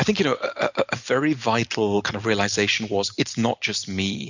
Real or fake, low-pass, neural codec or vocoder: real; 7.2 kHz; none